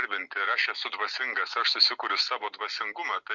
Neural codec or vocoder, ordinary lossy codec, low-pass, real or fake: none; MP3, 48 kbps; 7.2 kHz; real